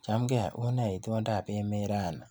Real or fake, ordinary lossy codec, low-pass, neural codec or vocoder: real; none; none; none